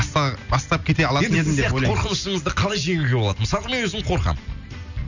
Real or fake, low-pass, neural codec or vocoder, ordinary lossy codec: real; 7.2 kHz; none; none